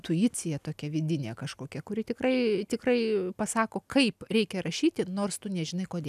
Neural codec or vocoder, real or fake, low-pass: none; real; 14.4 kHz